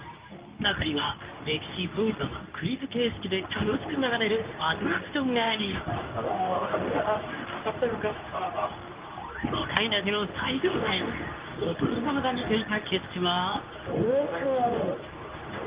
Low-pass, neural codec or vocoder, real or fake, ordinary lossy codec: 3.6 kHz; codec, 24 kHz, 0.9 kbps, WavTokenizer, medium speech release version 2; fake; Opus, 32 kbps